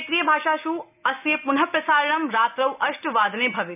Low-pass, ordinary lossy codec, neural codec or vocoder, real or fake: 3.6 kHz; none; none; real